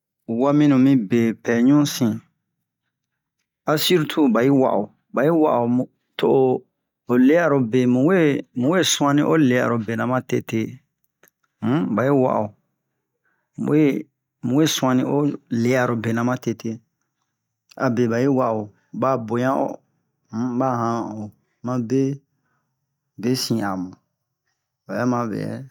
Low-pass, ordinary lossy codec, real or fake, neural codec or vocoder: 19.8 kHz; none; real; none